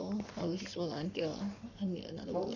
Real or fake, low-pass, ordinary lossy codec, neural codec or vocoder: fake; 7.2 kHz; none; codec, 44.1 kHz, 7.8 kbps, Pupu-Codec